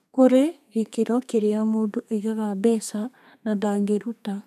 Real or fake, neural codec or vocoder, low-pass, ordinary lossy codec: fake; codec, 32 kHz, 1.9 kbps, SNAC; 14.4 kHz; none